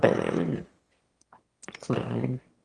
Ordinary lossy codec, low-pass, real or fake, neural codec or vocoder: Opus, 24 kbps; 9.9 kHz; fake; autoencoder, 22.05 kHz, a latent of 192 numbers a frame, VITS, trained on one speaker